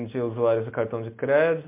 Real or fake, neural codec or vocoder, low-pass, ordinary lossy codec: real; none; 3.6 kHz; MP3, 24 kbps